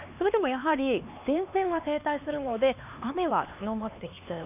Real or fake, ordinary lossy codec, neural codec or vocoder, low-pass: fake; none; codec, 16 kHz, 2 kbps, X-Codec, HuBERT features, trained on LibriSpeech; 3.6 kHz